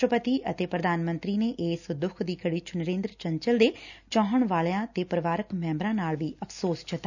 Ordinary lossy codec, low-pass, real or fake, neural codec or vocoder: none; 7.2 kHz; real; none